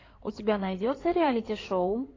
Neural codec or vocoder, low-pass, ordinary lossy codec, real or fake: codec, 16 kHz in and 24 kHz out, 2.2 kbps, FireRedTTS-2 codec; 7.2 kHz; AAC, 32 kbps; fake